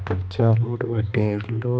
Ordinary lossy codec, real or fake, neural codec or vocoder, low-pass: none; fake; codec, 16 kHz, 2 kbps, X-Codec, HuBERT features, trained on balanced general audio; none